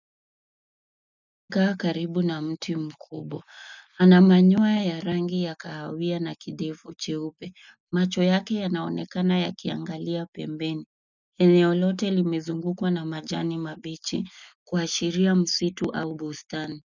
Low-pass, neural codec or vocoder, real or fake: 7.2 kHz; none; real